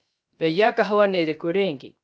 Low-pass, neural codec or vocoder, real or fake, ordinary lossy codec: none; codec, 16 kHz, 0.7 kbps, FocalCodec; fake; none